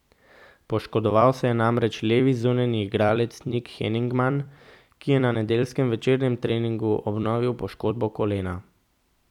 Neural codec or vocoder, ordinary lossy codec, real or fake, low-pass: vocoder, 44.1 kHz, 128 mel bands every 256 samples, BigVGAN v2; none; fake; 19.8 kHz